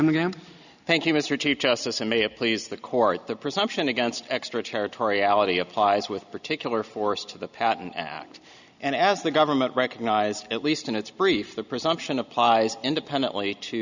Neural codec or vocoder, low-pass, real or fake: none; 7.2 kHz; real